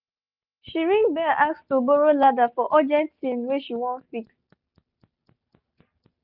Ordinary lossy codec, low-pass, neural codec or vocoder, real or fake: none; 5.4 kHz; none; real